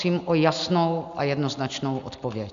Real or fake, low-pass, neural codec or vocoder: real; 7.2 kHz; none